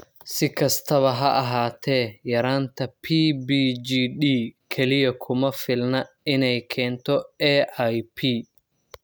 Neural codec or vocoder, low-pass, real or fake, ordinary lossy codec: none; none; real; none